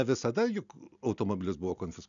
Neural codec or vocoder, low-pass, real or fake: none; 7.2 kHz; real